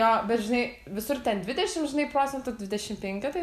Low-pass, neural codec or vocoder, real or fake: 14.4 kHz; none; real